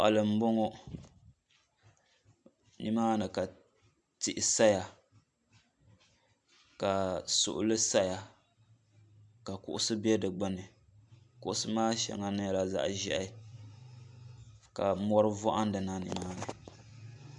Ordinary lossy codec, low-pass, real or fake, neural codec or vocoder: MP3, 96 kbps; 10.8 kHz; real; none